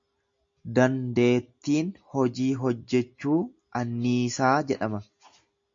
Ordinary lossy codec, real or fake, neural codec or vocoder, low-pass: MP3, 48 kbps; real; none; 7.2 kHz